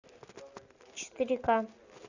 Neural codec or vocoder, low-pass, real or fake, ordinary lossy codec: none; 7.2 kHz; real; Opus, 64 kbps